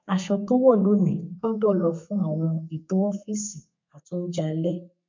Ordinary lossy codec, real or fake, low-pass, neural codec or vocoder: MP3, 64 kbps; fake; 7.2 kHz; codec, 32 kHz, 1.9 kbps, SNAC